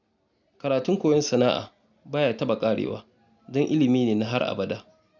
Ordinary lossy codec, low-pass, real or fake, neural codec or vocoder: none; 7.2 kHz; real; none